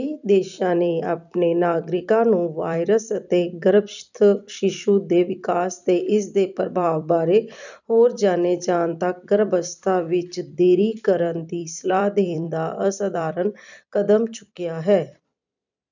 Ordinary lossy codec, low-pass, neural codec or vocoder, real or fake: none; 7.2 kHz; vocoder, 44.1 kHz, 128 mel bands every 256 samples, BigVGAN v2; fake